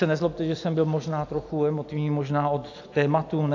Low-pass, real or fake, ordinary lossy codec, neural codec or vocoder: 7.2 kHz; real; AAC, 48 kbps; none